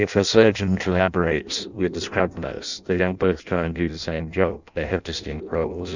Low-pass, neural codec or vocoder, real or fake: 7.2 kHz; codec, 16 kHz in and 24 kHz out, 0.6 kbps, FireRedTTS-2 codec; fake